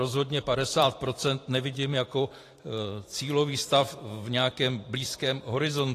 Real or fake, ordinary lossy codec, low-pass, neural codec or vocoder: fake; AAC, 48 kbps; 14.4 kHz; vocoder, 44.1 kHz, 128 mel bands every 512 samples, BigVGAN v2